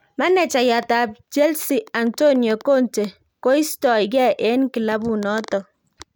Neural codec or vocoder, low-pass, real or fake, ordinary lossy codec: none; none; real; none